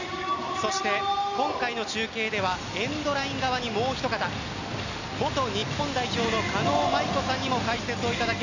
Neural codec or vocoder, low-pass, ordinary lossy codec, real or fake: none; 7.2 kHz; none; real